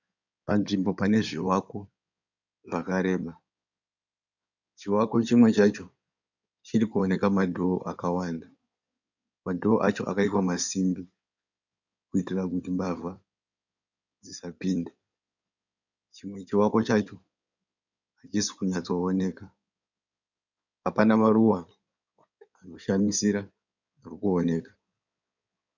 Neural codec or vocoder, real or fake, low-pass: codec, 16 kHz in and 24 kHz out, 2.2 kbps, FireRedTTS-2 codec; fake; 7.2 kHz